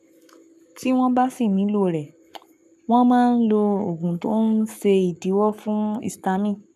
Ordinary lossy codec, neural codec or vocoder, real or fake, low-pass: none; codec, 44.1 kHz, 7.8 kbps, Pupu-Codec; fake; 14.4 kHz